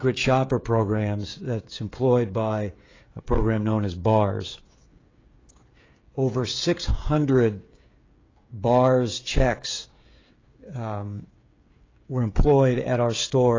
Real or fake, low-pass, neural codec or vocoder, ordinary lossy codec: fake; 7.2 kHz; codec, 16 kHz, 16 kbps, FreqCodec, smaller model; AAC, 32 kbps